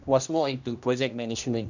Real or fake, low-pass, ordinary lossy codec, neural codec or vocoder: fake; 7.2 kHz; none; codec, 16 kHz, 1 kbps, X-Codec, HuBERT features, trained on general audio